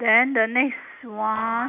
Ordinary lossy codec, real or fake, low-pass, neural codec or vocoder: none; real; 3.6 kHz; none